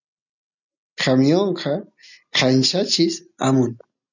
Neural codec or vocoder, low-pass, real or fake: none; 7.2 kHz; real